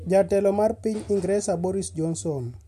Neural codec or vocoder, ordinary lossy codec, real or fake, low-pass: none; MP3, 64 kbps; real; 14.4 kHz